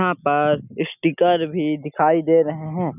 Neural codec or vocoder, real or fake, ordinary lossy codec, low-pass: none; real; none; 3.6 kHz